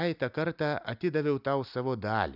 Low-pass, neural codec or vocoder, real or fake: 5.4 kHz; none; real